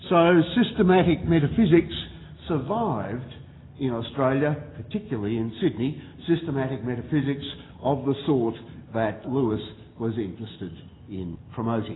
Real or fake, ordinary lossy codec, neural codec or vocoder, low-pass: real; AAC, 16 kbps; none; 7.2 kHz